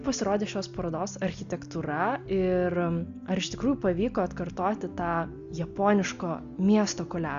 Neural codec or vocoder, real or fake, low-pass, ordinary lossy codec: none; real; 7.2 kHz; Opus, 64 kbps